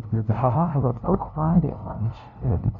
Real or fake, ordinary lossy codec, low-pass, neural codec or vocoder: fake; none; 7.2 kHz; codec, 16 kHz, 0.5 kbps, FunCodec, trained on LibriTTS, 25 frames a second